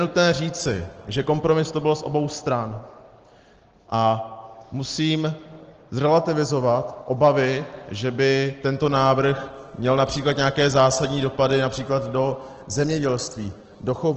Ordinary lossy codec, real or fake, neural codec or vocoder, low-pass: Opus, 16 kbps; real; none; 7.2 kHz